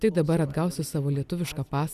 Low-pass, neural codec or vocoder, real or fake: 14.4 kHz; none; real